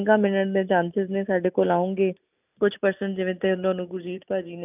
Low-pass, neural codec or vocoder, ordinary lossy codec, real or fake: 3.6 kHz; none; none; real